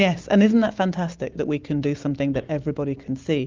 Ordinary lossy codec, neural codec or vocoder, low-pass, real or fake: Opus, 24 kbps; none; 7.2 kHz; real